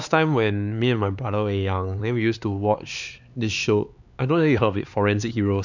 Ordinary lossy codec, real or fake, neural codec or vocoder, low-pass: none; fake; codec, 16 kHz, 4 kbps, X-Codec, HuBERT features, trained on LibriSpeech; 7.2 kHz